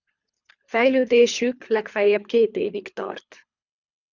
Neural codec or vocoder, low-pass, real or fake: codec, 24 kHz, 3 kbps, HILCodec; 7.2 kHz; fake